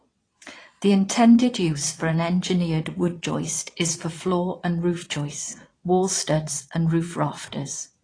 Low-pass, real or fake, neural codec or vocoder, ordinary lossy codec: 9.9 kHz; fake; vocoder, 22.05 kHz, 80 mel bands, Vocos; AAC, 32 kbps